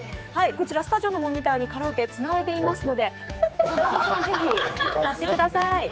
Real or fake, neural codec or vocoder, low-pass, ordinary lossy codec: fake; codec, 16 kHz, 4 kbps, X-Codec, HuBERT features, trained on general audio; none; none